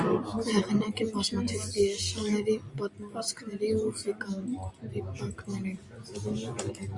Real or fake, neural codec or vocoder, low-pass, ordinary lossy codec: real; none; 10.8 kHz; Opus, 64 kbps